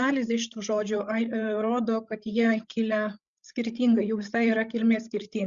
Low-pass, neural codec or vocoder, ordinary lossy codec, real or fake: 7.2 kHz; codec, 16 kHz, 8 kbps, FreqCodec, larger model; Opus, 64 kbps; fake